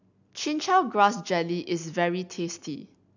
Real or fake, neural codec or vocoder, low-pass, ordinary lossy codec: real; none; 7.2 kHz; none